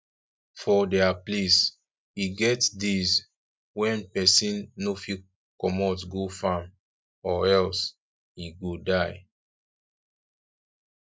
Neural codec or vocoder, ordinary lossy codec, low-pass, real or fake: none; none; none; real